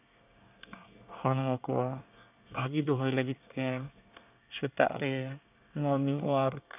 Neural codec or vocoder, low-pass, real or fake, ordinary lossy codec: codec, 24 kHz, 1 kbps, SNAC; 3.6 kHz; fake; none